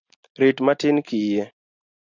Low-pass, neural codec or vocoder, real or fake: 7.2 kHz; none; real